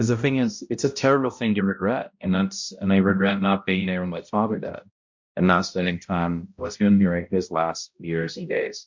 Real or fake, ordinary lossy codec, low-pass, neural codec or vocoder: fake; MP3, 48 kbps; 7.2 kHz; codec, 16 kHz, 0.5 kbps, X-Codec, HuBERT features, trained on balanced general audio